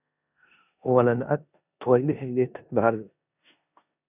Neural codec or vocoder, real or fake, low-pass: codec, 16 kHz in and 24 kHz out, 0.9 kbps, LongCat-Audio-Codec, four codebook decoder; fake; 3.6 kHz